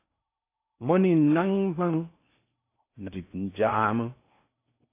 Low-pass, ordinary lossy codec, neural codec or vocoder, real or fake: 3.6 kHz; AAC, 24 kbps; codec, 16 kHz in and 24 kHz out, 0.6 kbps, FocalCodec, streaming, 4096 codes; fake